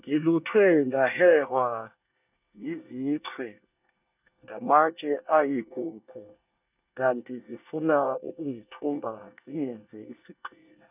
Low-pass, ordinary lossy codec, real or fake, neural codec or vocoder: 3.6 kHz; none; fake; codec, 24 kHz, 1 kbps, SNAC